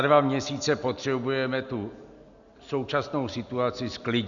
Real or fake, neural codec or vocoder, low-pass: real; none; 7.2 kHz